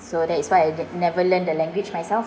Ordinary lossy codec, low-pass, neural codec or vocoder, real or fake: none; none; none; real